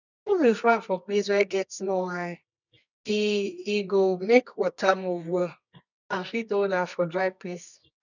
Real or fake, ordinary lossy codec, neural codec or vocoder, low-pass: fake; none; codec, 24 kHz, 0.9 kbps, WavTokenizer, medium music audio release; 7.2 kHz